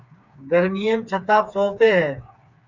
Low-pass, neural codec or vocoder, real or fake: 7.2 kHz; codec, 16 kHz, 4 kbps, FreqCodec, smaller model; fake